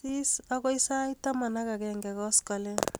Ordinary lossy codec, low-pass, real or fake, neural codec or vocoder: none; none; real; none